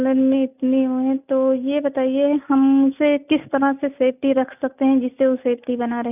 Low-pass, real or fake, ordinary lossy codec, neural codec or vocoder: 3.6 kHz; real; none; none